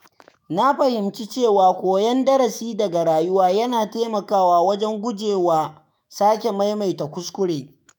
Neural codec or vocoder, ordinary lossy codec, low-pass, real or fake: autoencoder, 48 kHz, 128 numbers a frame, DAC-VAE, trained on Japanese speech; none; none; fake